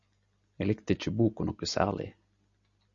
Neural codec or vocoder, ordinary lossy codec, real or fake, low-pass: none; Opus, 64 kbps; real; 7.2 kHz